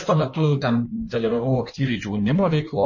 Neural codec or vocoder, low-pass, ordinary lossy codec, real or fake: codec, 16 kHz in and 24 kHz out, 1.1 kbps, FireRedTTS-2 codec; 7.2 kHz; MP3, 32 kbps; fake